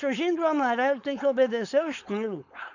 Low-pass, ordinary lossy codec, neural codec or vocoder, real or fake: 7.2 kHz; none; codec, 16 kHz, 4.8 kbps, FACodec; fake